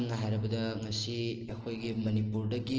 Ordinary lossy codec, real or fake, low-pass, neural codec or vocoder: Opus, 16 kbps; real; 7.2 kHz; none